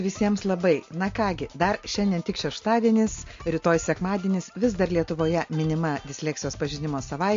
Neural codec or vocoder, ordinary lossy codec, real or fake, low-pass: none; MP3, 48 kbps; real; 7.2 kHz